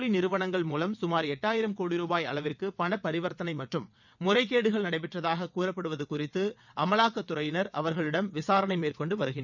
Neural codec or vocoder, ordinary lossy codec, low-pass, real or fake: vocoder, 22.05 kHz, 80 mel bands, WaveNeXt; none; 7.2 kHz; fake